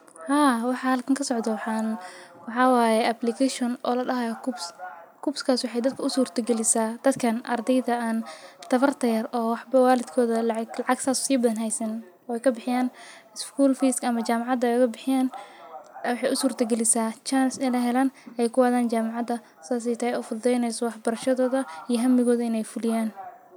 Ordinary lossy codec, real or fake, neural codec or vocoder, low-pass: none; real; none; none